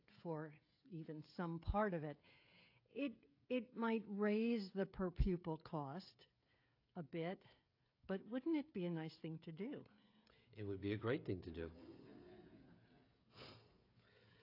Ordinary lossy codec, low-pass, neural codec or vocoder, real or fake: AAC, 32 kbps; 5.4 kHz; codec, 16 kHz, 16 kbps, FreqCodec, smaller model; fake